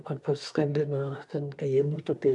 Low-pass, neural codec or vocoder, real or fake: 10.8 kHz; codec, 24 kHz, 1 kbps, SNAC; fake